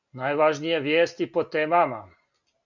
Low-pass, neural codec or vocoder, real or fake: 7.2 kHz; none; real